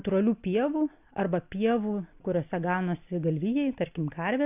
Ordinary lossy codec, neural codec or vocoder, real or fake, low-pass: AAC, 32 kbps; none; real; 3.6 kHz